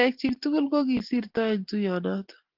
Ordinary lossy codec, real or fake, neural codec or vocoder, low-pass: Opus, 16 kbps; real; none; 5.4 kHz